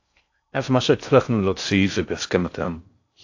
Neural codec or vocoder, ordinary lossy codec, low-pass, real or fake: codec, 16 kHz in and 24 kHz out, 0.6 kbps, FocalCodec, streaming, 4096 codes; AAC, 48 kbps; 7.2 kHz; fake